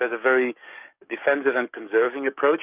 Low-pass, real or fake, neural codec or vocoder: 3.6 kHz; fake; codec, 44.1 kHz, 7.8 kbps, DAC